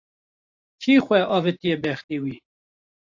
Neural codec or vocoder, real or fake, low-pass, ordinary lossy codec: vocoder, 44.1 kHz, 128 mel bands every 512 samples, BigVGAN v2; fake; 7.2 kHz; AAC, 48 kbps